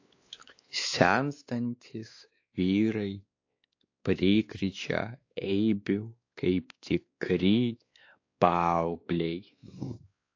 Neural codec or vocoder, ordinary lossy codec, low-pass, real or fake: codec, 16 kHz, 2 kbps, X-Codec, WavLM features, trained on Multilingual LibriSpeech; AAC, 48 kbps; 7.2 kHz; fake